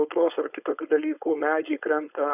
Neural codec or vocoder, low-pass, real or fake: codec, 16 kHz, 4.8 kbps, FACodec; 3.6 kHz; fake